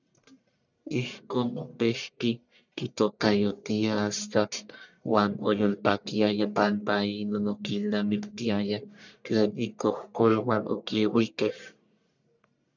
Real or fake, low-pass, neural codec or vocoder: fake; 7.2 kHz; codec, 44.1 kHz, 1.7 kbps, Pupu-Codec